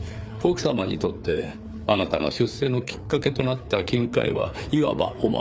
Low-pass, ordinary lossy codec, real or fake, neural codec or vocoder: none; none; fake; codec, 16 kHz, 4 kbps, FreqCodec, larger model